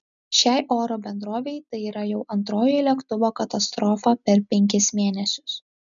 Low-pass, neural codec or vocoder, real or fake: 7.2 kHz; none; real